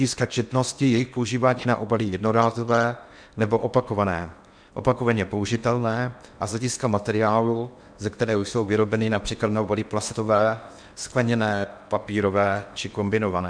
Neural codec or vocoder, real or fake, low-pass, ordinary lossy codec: codec, 16 kHz in and 24 kHz out, 0.8 kbps, FocalCodec, streaming, 65536 codes; fake; 9.9 kHz; MP3, 96 kbps